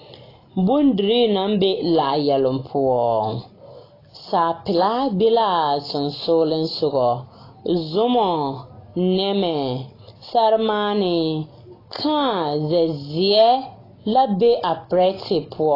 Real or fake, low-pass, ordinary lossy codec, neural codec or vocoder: real; 5.4 kHz; AAC, 24 kbps; none